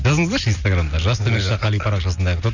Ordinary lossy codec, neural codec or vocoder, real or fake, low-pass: none; none; real; 7.2 kHz